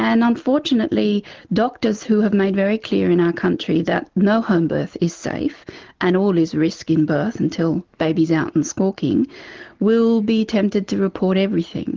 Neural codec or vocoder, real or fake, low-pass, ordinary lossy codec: none; real; 7.2 kHz; Opus, 16 kbps